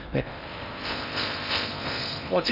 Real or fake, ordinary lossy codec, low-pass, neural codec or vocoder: fake; none; 5.4 kHz; codec, 16 kHz in and 24 kHz out, 0.6 kbps, FocalCodec, streaming, 4096 codes